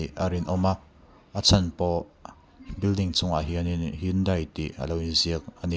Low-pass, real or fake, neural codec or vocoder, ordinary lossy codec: none; real; none; none